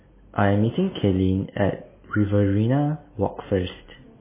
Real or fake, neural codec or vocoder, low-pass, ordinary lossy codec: real; none; 3.6 kHz; MP3, 16 kbps